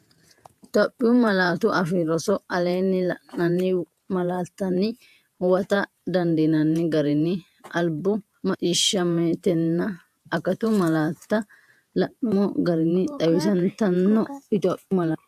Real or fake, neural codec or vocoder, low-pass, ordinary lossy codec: fake; vocoder, 48 kHz, 128 mel bands, Vocos; 14.4 kHz; AAC, 96 kbps